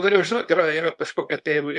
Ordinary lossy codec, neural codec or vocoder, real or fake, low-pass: MP3, 64 kbps; codec, 24 kHz, 0.9 kbps, WavTokenizer, small release; fake; 10.8 kHz